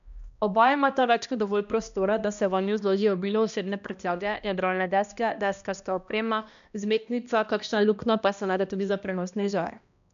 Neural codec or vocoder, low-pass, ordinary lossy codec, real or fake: codec, 16 kHz, 1 kbps, X-Codec, HuBERT features, trained on balanced general audio; 7.2 kHz; none; fake